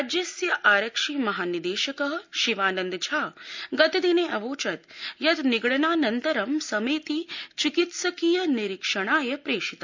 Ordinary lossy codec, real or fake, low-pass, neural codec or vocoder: MP3, 64 kbps; real; 7.2 kHz; none